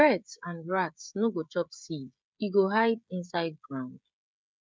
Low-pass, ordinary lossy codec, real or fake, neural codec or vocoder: none; none; fake; codec, 16 kHz, 16 kbps, FreqCodec, smaller model